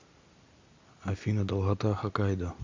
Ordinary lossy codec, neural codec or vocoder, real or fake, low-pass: MP3, 64 kbps; none; real; 7.2 kHz